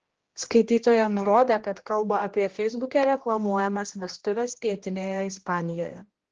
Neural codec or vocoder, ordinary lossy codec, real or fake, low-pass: codec, 16 kHz, 1 kbps, X-Codec, HuBERT features, trained on general audio; Opus, 16 kbps; fake; 7.2 kHz